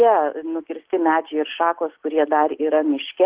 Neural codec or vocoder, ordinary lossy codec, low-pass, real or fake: none; Opus, 16 kbps; 3.6 kHz; real